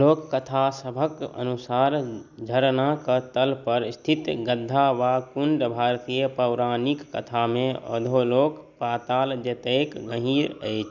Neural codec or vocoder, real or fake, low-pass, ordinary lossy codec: none; real; 7.2 kHz; none